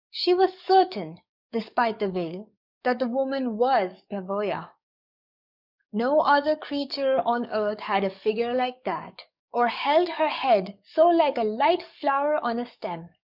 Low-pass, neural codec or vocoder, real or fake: 5.4 kHz; codec, 44.1 kHz, 7.8 kbps, DAC; fake